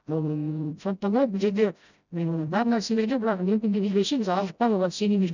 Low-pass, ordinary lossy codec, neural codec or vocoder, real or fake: 7.2 kHz; none; codec, 16 kHz, 0.5 kbps, FreqCodec, smaller model; fake